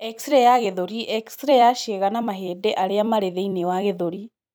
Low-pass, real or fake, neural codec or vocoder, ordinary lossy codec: none; fake; vocoder, 44.1 kHz, 128 mel bands every 256 samples, BigVGAN v2; none